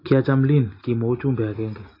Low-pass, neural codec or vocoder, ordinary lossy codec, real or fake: 5.4 kHz; none; AAC, 24 kbps; real